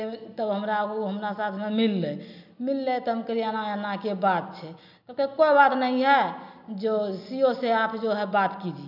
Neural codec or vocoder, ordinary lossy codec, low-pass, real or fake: none; none; 5.4 kHz; real